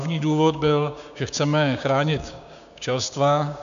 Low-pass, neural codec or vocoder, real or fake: 7.2 kHz; codec, 16 kHz, 6 kbps, DAC; fake